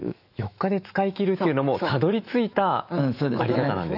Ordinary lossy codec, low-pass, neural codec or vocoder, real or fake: none; 5.4 kHz; autoencoder, 48 kHz, 128 numbers a frame, DAC-VAE, trained on Japanese speech; fake